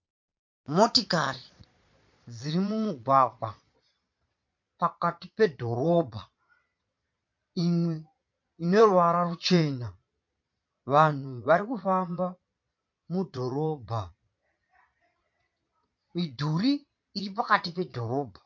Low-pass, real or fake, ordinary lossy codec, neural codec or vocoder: 7.2 kHz; fake; MP3, 48 kbps; vocoder, 22.05 kHz, 80 mel bands, Vocos